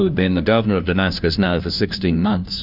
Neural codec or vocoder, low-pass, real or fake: codec, 16 kHz, 1 kbps, FunCodec, trained on LibriTTS, 50 frames a second; 5.4 kHz; fake